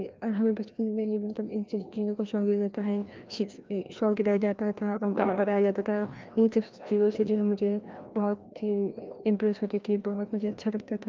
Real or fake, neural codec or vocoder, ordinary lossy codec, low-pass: fake; codec, 16 kHz, 1 kbps, FreqCodec, larger model; Opus, 24 kbps; 7.2 kHz